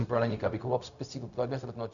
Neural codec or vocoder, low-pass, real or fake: codec, 16 kHz, 0.4 kbps, LongCat-Audio-Codec; 7.2 kHz; fake